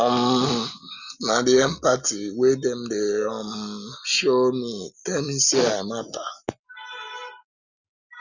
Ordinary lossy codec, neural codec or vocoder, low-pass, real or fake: none; none; 7.2 kHz; real